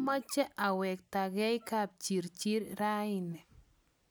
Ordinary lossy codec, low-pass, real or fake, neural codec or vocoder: none; none; real; none